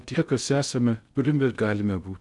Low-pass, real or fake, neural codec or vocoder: 10.8 kHz; fake; codec, 16 kHz in and 24 kHz out, 0.6 kbps, FocalCodec, streaming, 2048 codes